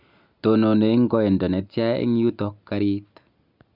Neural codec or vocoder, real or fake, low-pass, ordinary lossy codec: none; real; 5.4 kHz; none